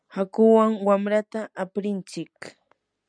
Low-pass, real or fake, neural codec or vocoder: 9.9 kHz; real; none